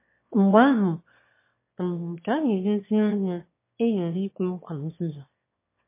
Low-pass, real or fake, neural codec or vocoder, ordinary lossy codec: 3.6 kHz; fake; autoencoder, 22.05 kHz, a latent of 192 numbers a frame, VITS, trained on one speaker; MP3, 24 kbps